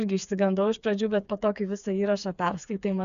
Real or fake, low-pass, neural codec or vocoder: fake; 7.2 kHz; codec, 16 kHz, 4 kbps, FreqCodec, smaller model